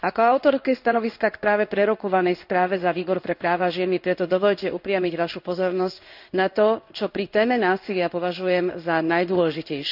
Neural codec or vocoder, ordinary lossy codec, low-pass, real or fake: codec, 16 kHz in and 24 kHz out, 1 kbps, XY-Tokenizer; none; 5.4 kHz; fake